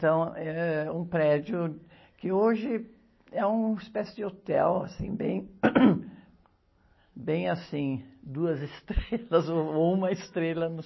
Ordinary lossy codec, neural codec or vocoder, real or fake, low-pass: MP3, 24 kbps; none; real; 7.2 kHz